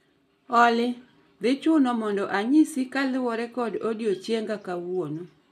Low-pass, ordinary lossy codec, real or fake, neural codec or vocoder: 14.4 kHz; none; real; none